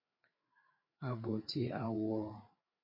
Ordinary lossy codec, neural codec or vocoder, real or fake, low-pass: MP3, 32 kbps; codec, 16 kHz, 4 kbps, FreqCodec, larger model; fake; 5.4 kHz